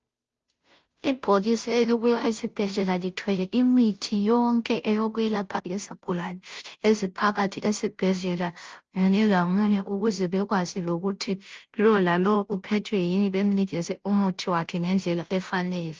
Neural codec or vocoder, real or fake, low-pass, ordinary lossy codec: codec, 16 kHz, 0.5 kbps, FunCodec, trained on Chinese and English, 25 frames a second; fake; 7.2 kHz; Opus, 24 kbps